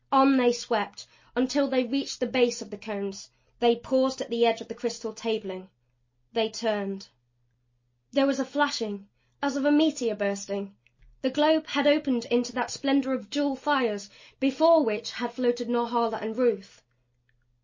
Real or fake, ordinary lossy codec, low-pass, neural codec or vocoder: real; MP3, 32 kbps; 7.2 kHz; none